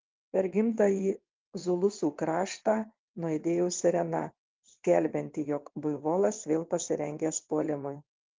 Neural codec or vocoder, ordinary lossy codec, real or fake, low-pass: vocoder, 22.05 kHz, 80 mel bands, WaveNeXt; Opus, 32 kbps; fake; 7.2 kHz